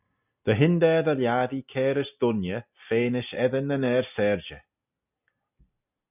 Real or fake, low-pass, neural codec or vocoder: real; 3.6 kHz; none